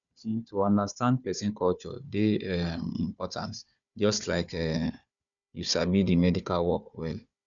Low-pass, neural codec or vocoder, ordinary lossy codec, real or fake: 7.2 kHz; codec, 16 kHz, 4 kbps, FunCodec, trained on Chinese and English, 50 frames a second; none; fake